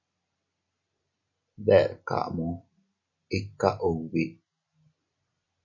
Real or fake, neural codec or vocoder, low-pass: real; none; 7.2 kHz